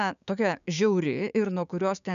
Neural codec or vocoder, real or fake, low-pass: codec, 16 kHz, 6 kbps, DAC; fake; 7.2 kHz